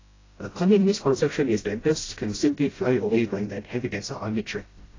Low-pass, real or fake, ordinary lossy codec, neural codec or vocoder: 7.2 kHz; fake; AAC, 32 kbps; codec, 16 kHz, 0.5 kbps, FreqCodec, smaller model